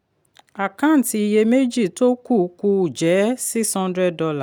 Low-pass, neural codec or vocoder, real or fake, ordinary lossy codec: none; none; real; none